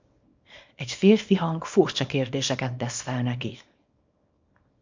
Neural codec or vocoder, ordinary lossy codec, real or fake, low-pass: codec, 24 kHz, 0.9 kbps, WavTokenizer, small release; MP3, 64 kbps; fake; 7.2 kHz